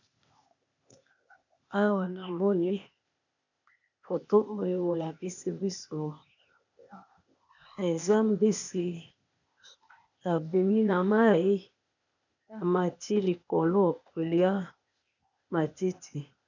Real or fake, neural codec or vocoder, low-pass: fake; codec, 16 kHz, 0.8 kbps, ZipCodec; 7.2 kHz